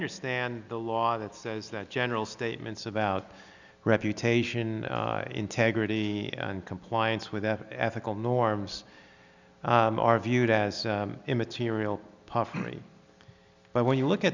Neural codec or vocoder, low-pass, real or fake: none; 7.2 kHz; real